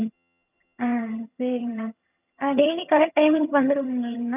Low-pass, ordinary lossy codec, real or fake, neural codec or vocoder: 3.6 kHz; none; fake; vocoder, 22.05 kHz, 80 mel bands, HiFi-GAN